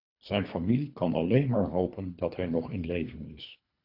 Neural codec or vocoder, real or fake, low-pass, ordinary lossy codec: codec, 24 kHz, 3 kbps, HILCodec; fake; 5.4 kHz; AAC, 32 kbps